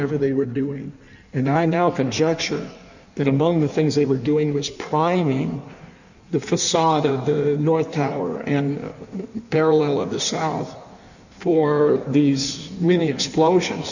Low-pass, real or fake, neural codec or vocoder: 7.2 kHz; fake; codec, 16 kHz in and 24 kHz out, 1.1 kbps, FireRedTTS-2 codec